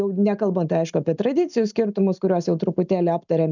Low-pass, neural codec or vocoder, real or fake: 7.2 kHz; none; real